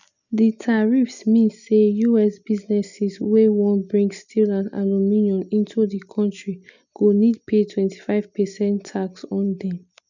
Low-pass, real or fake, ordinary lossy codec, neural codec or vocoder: 7.2 kHz; real; none; none